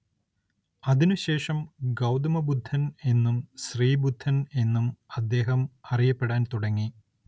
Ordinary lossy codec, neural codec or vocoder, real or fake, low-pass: none; none; real; none